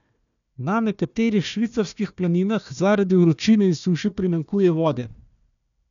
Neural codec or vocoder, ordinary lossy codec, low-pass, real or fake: codec, 16 kHz, 1 kbps, FunCodec, trained on Chinese and English, 50 frames a second; none; 7.2 kHz; fake